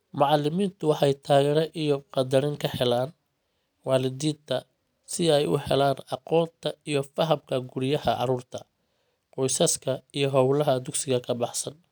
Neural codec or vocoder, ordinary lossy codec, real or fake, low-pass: none; none; real; none